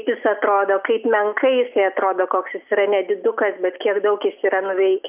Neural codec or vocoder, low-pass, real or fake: none; 3.6 kHz; real